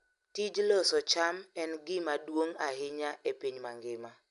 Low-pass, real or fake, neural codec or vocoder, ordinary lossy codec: 10.8 kHz; real; none; none